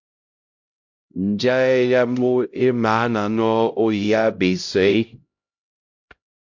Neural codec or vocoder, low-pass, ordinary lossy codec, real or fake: codec, 16 kHz, 0.5 kbps, X-Codec, HuBERT features, trained on LibriSpeech; 7.2 kHz; MP3, 48 kbps; fake